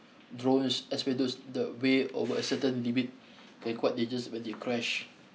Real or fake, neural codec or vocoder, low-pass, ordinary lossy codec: real; none; none; none